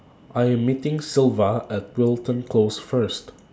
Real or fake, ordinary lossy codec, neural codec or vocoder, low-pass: real; none; none; none